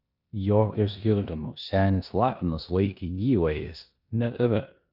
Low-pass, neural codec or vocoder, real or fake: 5.4 kHz; codec, 16 kHz in and 24 kHz out, 0.9 kbps, LongCat-Audio-Codec, four codebook decoder; fake